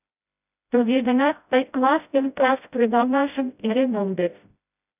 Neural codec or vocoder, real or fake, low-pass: codec, 16 kHz, 0.5 kbps, FreqCodec, smaller model; fake; 3.6 kHz